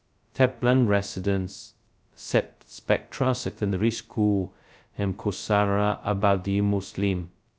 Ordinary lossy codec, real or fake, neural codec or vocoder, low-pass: none; fake; codec, 16 kHz, 0.2 kbps, FocalCodec; none